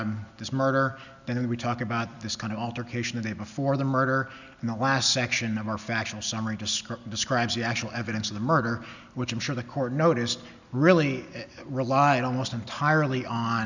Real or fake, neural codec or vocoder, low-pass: real; none; 7.2 kHz